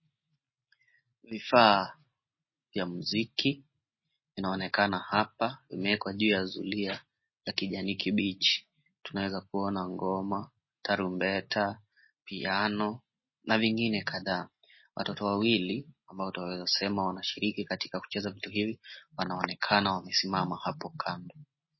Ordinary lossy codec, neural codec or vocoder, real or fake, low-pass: MP3, 24 kbps; none; real; 7.2 kHz